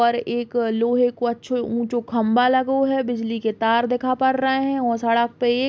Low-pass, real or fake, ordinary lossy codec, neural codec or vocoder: none; real; none; none